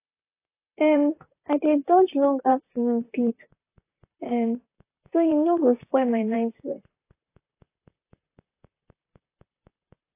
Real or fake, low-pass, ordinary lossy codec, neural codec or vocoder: fake; 3.6 kHz; AAC, 24 kbps; vocoder, 44.1 kHz, 128 mel bands, Pupu-Vocoder